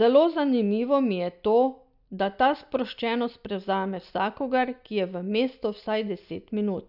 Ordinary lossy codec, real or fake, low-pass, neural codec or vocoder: none; real; 5.4 kHz; none